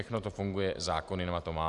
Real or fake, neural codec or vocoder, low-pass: real; none; 10.8 kHz